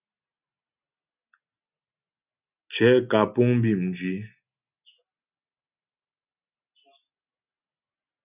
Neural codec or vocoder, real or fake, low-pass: none; real; 3.6 kHz